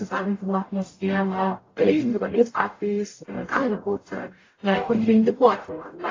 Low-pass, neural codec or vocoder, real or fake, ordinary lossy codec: 7.2 kHz; codec, 44.1 kHz, 0.9 kbps, DAC; fake; AAC, 32 kbps